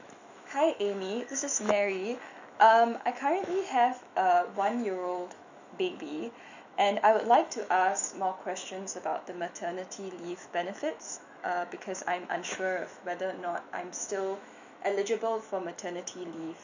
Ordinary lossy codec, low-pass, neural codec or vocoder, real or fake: none; 7.2 kHz; autoencoder, 48 kHz, 128 numbers a frame, DAC-VAE, trained on Japanese speech; fake